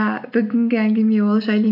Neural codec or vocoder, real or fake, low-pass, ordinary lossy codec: none; real; 5.4 kHz; none